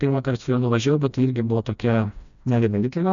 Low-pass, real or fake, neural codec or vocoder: 7.2 kHz; fake; codec, 16 kHz, 1 kbps, FreqCodec, smaller model